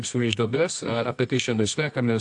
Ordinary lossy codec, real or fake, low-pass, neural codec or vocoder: AAC, 64 kbps; fake; 10.8 kHz; codec, 24 kHz, 0.9 kbps, WavTokenizer, medium music audio release